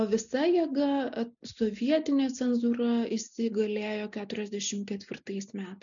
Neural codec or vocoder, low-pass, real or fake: none; 7.2 kHz; real